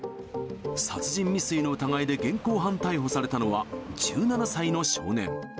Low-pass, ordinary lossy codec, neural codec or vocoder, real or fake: none; none; none; real